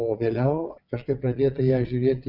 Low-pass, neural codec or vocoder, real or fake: 5.4 kHz; vocoder, 22.05 kHz, 80 mel bands, WaveNeXt; fake